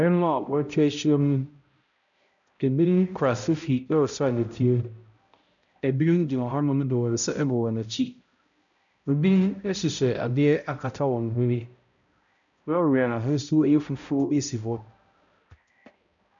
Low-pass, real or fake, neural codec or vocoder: 7.2 kHz; fake; codec, 16 kHz, 0.5 kbps, X-Codec, HuBERT features, trained on balanced general audio